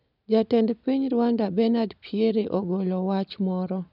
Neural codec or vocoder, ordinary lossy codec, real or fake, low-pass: none; none; real; 5.4 kHz